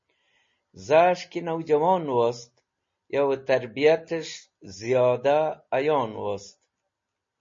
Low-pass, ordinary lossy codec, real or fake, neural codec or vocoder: 7.2 kHz; MP3, 32 kbps; real; none